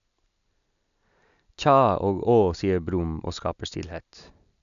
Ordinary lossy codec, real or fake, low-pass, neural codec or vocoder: none; real; 7.2 kHz; none